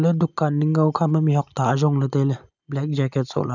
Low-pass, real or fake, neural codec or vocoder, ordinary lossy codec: 7.2 kHz; real; none; none